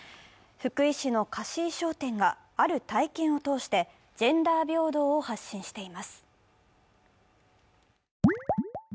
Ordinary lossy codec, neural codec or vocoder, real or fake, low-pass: none; none; real; none